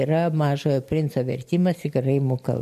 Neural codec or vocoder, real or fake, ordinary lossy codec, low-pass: none; real; MP3, 64 kbps; 14.4 kHz